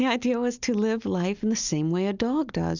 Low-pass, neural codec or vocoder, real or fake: 7.2 kHz; none; real